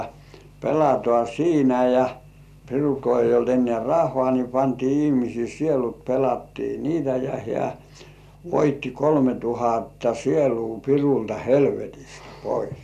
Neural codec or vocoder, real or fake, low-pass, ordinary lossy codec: none; real; 14.4 kHz; none